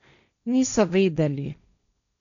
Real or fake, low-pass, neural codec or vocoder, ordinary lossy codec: fake; 7.2 kHz; codec, 16 kHz, 1.1 kbps, Voila-Tokenizer; none